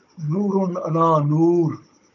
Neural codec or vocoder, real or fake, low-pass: codec, 16 kHz, 16 kbps, FunCodec, trained on Chinese and English, 50 frames a second; fake; 7.2 kHz